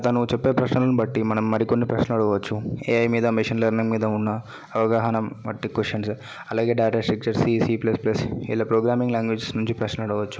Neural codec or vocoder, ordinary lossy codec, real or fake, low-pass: none; none; real; none